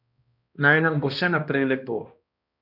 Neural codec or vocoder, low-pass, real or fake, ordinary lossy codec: codec, 16 kHz, 1 kbps, X-Codec, HuBERT features, trained on balanced general audio; 5.4 kHz; fake; none